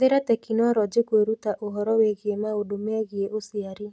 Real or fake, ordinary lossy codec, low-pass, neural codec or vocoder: real; none; none; none